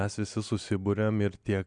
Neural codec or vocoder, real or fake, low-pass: none; real; 9.9 kHz